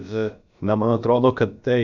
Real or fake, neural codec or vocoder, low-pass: fake; codec, 16 kHz, about 1 kbps, DyCAST, with the encoder's durations; 7.2 kHz